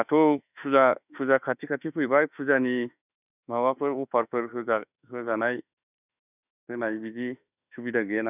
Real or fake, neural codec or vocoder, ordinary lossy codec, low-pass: fake; autoencoder, 48 kHz, 32 numbers a frame, DAC-VAE, trained on Japanese speech; none; 3.6 kHz